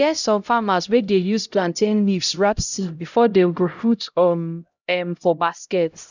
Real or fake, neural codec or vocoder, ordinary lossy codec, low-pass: fake; codec, 16 kHz, 0.5 kbps, X-Codec, HuBERT features, trained on LibriSpeech; none; 7.2 kHz